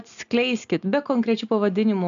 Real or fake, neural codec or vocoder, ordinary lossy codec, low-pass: real; none; AAC, 96 kbps; 7.2 kHz